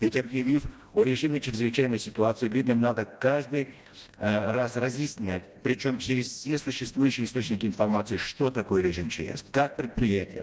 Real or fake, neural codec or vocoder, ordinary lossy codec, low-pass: fake; codec, 16 kHz, 1 kbps, FreqCodec, smaller model; none; none